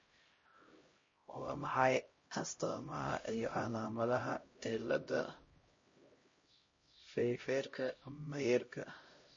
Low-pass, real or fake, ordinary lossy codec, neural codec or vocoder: 7.2 kHz; fake; MP3, 32 kbps; codec, 16 kHz, 0.5 kbps, X-Codec, HuBERT features, trained on LibriSpeech